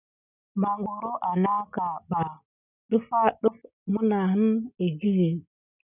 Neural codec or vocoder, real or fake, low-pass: none; real; 3.6 kHz